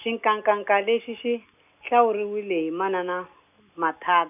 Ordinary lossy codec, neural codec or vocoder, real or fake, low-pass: none; none; real; 3.6 kHz